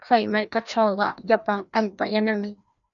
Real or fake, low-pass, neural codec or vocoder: fake; 7.2 kHz; codec, 16 kHz, 1 kbps, FreqCodec, larger model